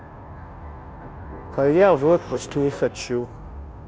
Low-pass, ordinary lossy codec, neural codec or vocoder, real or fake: none; none; codec, 16 kHz, 0.5 kbps, FunCodec, trained on Chinese and English, 25 frames a second; fake